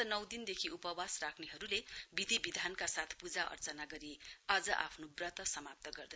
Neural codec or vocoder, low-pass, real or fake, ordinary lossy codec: none; none; real; none